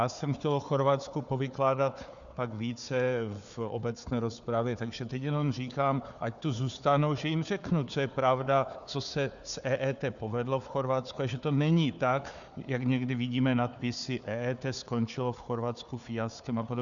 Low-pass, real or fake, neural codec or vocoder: 7.2 kHz; fake; codec, 16 kHz, 4 kbps, FunCodec, trained on Chinese and English, 50 frames a second